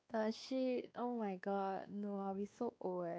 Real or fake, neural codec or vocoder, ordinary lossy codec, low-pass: fake; codec, 16 kHz, 2 kbps, X-Codec, WavLM features, trained on Multilingual LibriSpeech; none; none